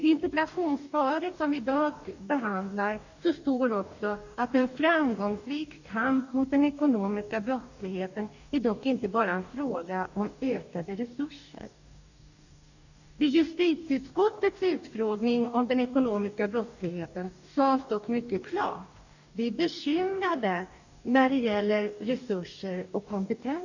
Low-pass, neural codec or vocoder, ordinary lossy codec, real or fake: 7.2 kHz; codec, 44.1 kHz, 2.6 kbps, DAC; none; fake